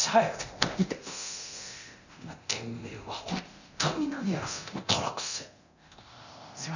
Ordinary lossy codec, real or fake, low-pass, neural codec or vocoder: none; fake; 7.2 kHz; codec, 24 kHz, 0.9 kbps, DualCodec